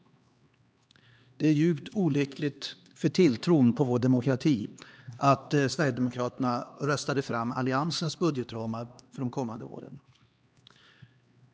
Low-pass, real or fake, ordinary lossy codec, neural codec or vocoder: none; fake; none; codec, 16 kHz, 2 kbps, X-Codec, HuBERT features, trained on LibriSpeech